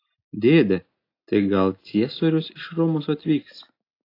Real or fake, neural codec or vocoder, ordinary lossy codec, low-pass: real; none; AAC, 32 kbps; 5.4 kHz